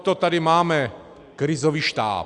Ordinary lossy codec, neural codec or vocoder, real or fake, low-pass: Opus, 64 kbps; none; real; 10.8 kHz